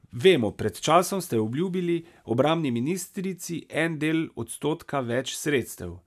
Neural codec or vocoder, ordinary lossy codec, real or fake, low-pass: none; none; real; 14.4 kHz